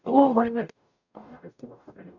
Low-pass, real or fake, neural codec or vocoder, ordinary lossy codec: 7.2 kHz; fake; codec, 44.1 kHz, 0.9 kbps, DAC; none